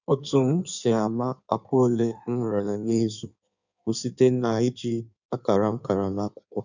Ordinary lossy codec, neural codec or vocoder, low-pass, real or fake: none; codec, 16 kHz in and 24 kHz out, 1.1 kbps, FireRedTTS-2 codec; 7.2 kHz; fake